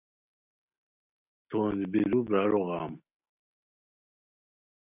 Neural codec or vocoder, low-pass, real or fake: none; 3.6 kHz; real